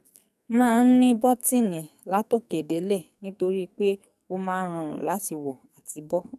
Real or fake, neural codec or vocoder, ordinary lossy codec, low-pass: fake; codec, 44.1 kHz, 2.6 kbps, SNAC; none; 14.4 kHz